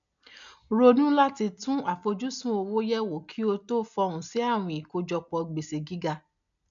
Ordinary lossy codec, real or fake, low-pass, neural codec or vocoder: none; real; 7.2 kHz; none